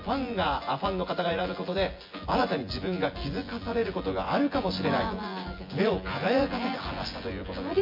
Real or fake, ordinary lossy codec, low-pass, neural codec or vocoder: fake; MP3, 32 kbps; 5.4 kHz; vocoder, 24 kHz, 100 mel bands, Vocos